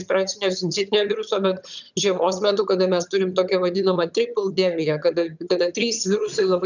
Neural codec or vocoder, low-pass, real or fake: vocoder, 22.05 kHz, 80 mel bands, HiFi-GAN; 7.2 kHz; fake